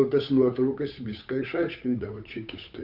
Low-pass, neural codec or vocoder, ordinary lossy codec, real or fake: 5.4 kHz; codec, 16 kHz, 8 kbps, FunCodec, trained on Chinese and English, 25 frames a second; Opus, 64 kbps; fake